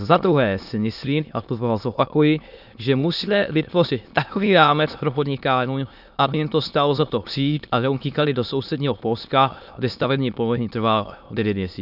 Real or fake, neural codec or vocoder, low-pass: fake; autoencoder, 22.05 kHz, a latent of 192 numbers a frame, VITS, trained on many speakers; 5.4 kHz